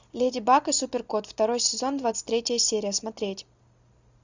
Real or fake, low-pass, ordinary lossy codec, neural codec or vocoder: real; 7.2 kHz; Opus, 64 kbps; none